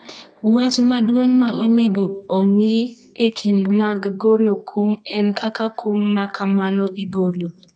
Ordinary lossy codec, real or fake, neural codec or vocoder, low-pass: none; fake; codec, 24 kHz, 0.9 kbps, WavTokenizer, medium music audio release; 9.9 kHz